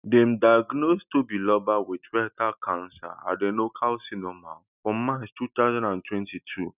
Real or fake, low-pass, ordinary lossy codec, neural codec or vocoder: real; 3.6 kHz; none; none